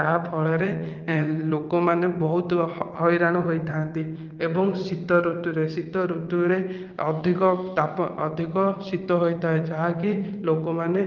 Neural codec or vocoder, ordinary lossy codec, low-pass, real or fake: vocoder, 22.05 kHz, 80 mel bands, WaveNeXt; Opus, 24 kbps; 7.2 kHz; fake